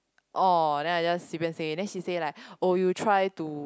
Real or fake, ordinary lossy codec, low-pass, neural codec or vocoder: real; none; none; none